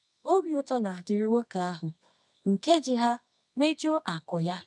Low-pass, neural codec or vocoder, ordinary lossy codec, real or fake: 10.8 kHz; codec, 24 kHz, 0.9 kbps, WavTokenizer, medium music audio release; none; fake